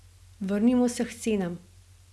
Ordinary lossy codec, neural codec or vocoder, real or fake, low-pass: none; none; real; none